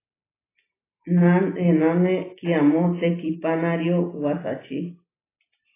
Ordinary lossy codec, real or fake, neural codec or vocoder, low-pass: AAC, 16 kbps; real; none; 3.6 kHz